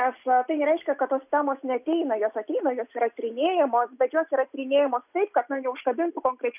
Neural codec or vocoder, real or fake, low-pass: none; real; 3.6 kHz